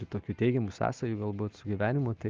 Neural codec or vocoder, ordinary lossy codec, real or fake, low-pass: none; Opus, 32 kbps; real; 7.2 kHz